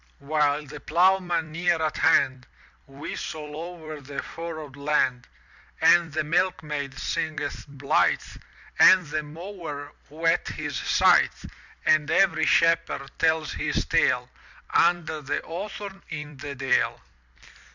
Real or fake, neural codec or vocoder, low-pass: fake; vocoder, 44.1 kHz, 128 mel bands every 256 samples, BigVGAN v2; 7.2 kHz